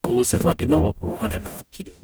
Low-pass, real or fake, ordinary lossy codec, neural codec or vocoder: none; fake; none; codec, 44.1 kHz, 0.9 kbps, DAC